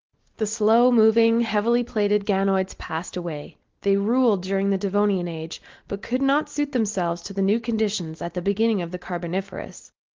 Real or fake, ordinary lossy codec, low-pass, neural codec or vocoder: real; Opus, 16 kbps; 7.2 kHz; none